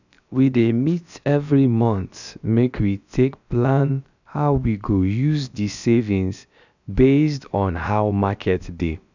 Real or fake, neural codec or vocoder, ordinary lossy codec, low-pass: fake; codec, 16 kHz, about 1 kbps, DyCAST, with the encoder's durations; none; 7.2 kHz